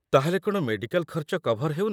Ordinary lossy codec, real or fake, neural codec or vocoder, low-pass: none; fake; vocoder, 44.1 kHz, 128 mel bands, Pupu-Vocoder; 19.8 kHz